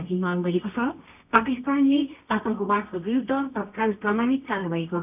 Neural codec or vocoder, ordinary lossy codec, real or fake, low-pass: codec, 24 kHz, 0.9 kbps, WavTokenizer, medium music audio release; none; fake; 3.6 kHz